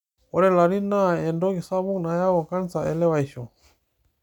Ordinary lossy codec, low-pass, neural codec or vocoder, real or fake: none; 19.8 kHz; none; real